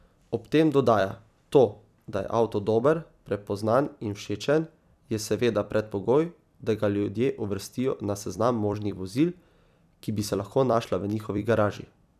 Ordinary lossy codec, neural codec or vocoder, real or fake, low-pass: none; vocoder, 44.1 kHz, 128 mel bands every 256 samples, BigVGAN v2; fake; 14.4 kHz